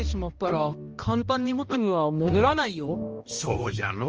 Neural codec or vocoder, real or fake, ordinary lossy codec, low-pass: codec, 16 kHz, 1 kbps, X-Codec, HuBERT features, trained on balanced general audio; fake; Opus, 24 kbps; 7.2 kHz